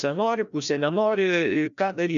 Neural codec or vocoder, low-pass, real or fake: codec, 16 kHz, 1 kbps, FreqCodec, larger model; 7.2 kHz; fake